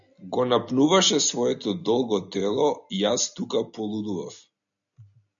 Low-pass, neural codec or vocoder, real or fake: 7.2 kHz; none; real